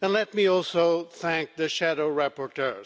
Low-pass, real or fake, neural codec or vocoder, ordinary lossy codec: none; real; none; none